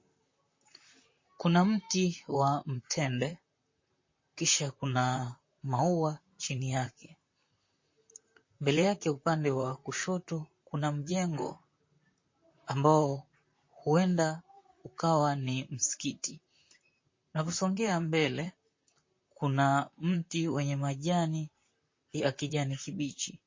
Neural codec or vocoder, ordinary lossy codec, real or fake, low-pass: vocoder, 44.1 kHz, 128 mel bands, Pupu-Vocoder; MP3, 32 kbps; fake; 7.2 kHz